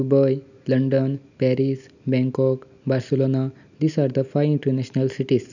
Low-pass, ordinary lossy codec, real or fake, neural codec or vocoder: 7.2 kHz; none; real; none